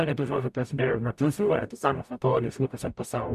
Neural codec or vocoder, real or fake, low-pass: codec, 44.1 kHz, 0.9 kbps, DAC; fake; 14.4 kHz